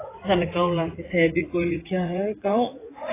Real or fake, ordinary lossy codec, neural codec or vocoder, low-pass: fake; AAC, 16 kbps; vocoder, 44.1 kHz, 80 mel bands, Vocos; 3.6 kHz